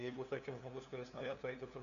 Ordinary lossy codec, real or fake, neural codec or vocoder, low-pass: MP3, 96 kbps; fake; codec, 16 kHz, 2 kbps, FunCodec, trained on LibriTTS, 25 frames a second; 7.2 kHz